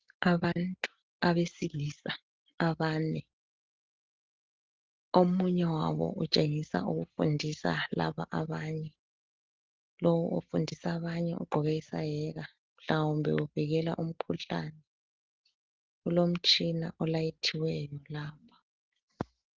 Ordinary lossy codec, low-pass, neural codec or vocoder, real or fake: Opus, 16 kbps; 7.2 kHz; none; real